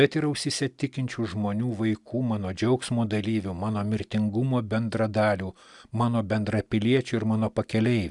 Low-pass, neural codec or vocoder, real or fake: 10.8 kHz; none; real